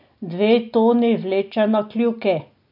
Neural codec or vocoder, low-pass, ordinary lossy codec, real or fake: none; 5.4 kHz; none; real